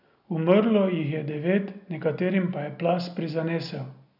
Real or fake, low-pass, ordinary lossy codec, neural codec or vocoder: real; 5.4 kHz; none; none